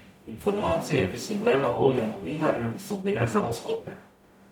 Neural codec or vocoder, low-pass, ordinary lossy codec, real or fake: codec, 44.1 kHz, 0.9 kbps, DAC; 19.8 kHz; none; fake